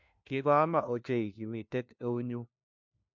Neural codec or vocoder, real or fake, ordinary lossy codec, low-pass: codec, 16 kHz, 1 kbps, FunCodec, trained on LibriTTS, 50 frames a second; fake; MP3, 64 kbps; 7.2 kHz